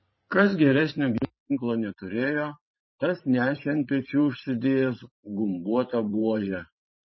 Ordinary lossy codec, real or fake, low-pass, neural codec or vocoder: MP3, 24 kbps; fake; 7.2 kHz; vocoder, 44.1 kHz, 128 mel bands every 512 samples, BigVGAN v2